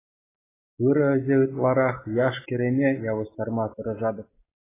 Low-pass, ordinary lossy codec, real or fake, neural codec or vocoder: 3.6 kHz; AAC, 16 kbps; real; none